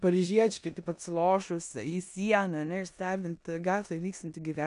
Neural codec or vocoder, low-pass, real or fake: codec, 16 kHz in and 24 kHz out, 0.9 kbps, LongCat-Audio-Codec, four codebook decoder; 10.8 kHz; fake